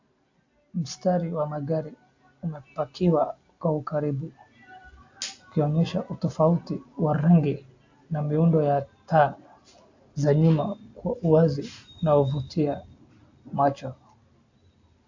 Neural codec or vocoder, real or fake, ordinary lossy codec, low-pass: none; real; AAC, 48 kbps; 7.2 kHz